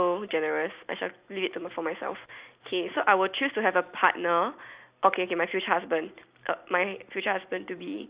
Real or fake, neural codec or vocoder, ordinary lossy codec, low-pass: real; none; Opus, 64 kbps; 3.6 kHz